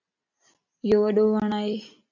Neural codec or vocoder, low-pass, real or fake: none; 7.2 kHz; real